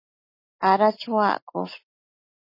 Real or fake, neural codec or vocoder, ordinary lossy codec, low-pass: real; none; MP3, 24 kbps; 5.4 kHz